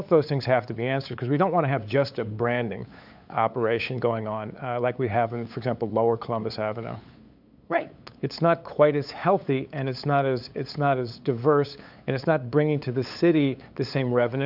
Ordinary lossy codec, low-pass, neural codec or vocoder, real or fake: AAC, 48 kbps; 5.4 kHz; codec, 16 kHz, 8 kbps, FunCodec, trained on LibriTTS, 25 frames a second; fake